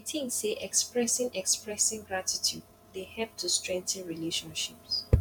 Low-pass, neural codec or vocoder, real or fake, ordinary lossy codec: 19.8 kHz; vocoder, 48 kHz, 128 mel bands, Vocos; fake; none